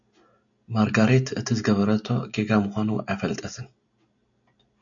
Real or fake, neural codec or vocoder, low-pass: real; none; 7.2 kHz